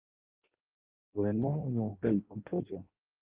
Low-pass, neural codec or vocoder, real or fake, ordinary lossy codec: 3.6 kHz; codec, 16 kHz in and 24 kHz out, 0.6 kbps, FireRedTTS-2 codec; fake; Opus, 32 kbps